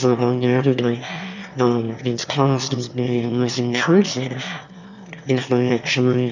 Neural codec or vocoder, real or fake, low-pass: autoencoder, 22.05 kHz, a latent of 192 numbers a frame, VITS, trained on one speaker; fake; 7.2 kHz